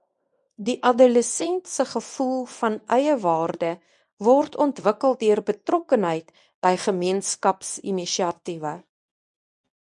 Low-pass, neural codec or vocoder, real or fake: 10.8 kHz; codec, 24 kHz, 0.9 kbps, WavTokenizer, medium speech release version 1; fake